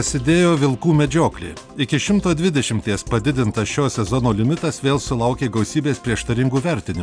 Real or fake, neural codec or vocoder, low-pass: real; none; 9.9 kHz